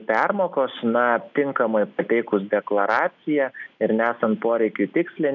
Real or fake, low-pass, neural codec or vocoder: real; 7.2 kHz; none